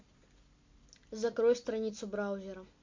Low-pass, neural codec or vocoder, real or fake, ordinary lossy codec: 7.2 kHz; none; real; MP3, 48 kbps